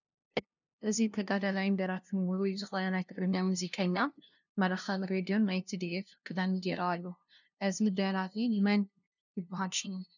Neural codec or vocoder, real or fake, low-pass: codec, 16 kHz, 0.5 kbps, FunCodec, trained on LibriTTS, 25 frames a second; fake; 7.2 kHz